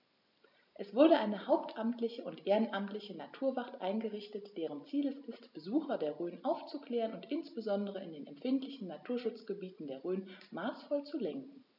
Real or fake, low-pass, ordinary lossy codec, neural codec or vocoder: real; 5.4 kHz; none; none